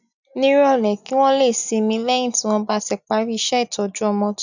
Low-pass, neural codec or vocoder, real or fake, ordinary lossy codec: 7.2 kHz; none; real; none